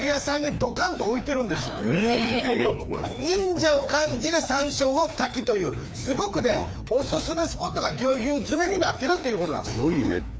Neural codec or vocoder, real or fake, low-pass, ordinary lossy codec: codec, 16 kHz, 2 kbps, FreqCodec, larger model; fake; none; none